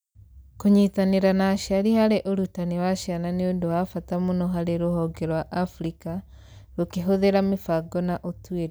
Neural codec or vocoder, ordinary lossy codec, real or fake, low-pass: none; none; real; none